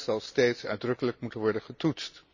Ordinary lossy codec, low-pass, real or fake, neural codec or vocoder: none; 7.2 kHz; real; none